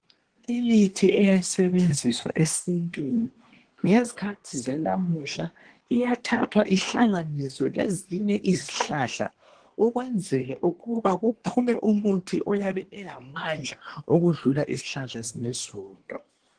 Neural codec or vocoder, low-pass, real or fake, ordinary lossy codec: codec, 24 kHz, 1 kbps, SNAC; 9.9 kHz; fake; Opus, 16 kbps